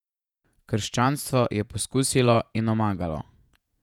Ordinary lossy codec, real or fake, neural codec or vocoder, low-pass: none; fake; vocoder, 48 kHz, 128 mel bands, Vocos; 19.8 kHz